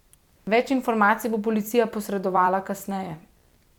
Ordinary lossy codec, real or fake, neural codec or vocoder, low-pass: none; fake; vocoder, 44.1 kHz, 128 mel bands every 256 samples, BigVGAN v2; 19.8 kHz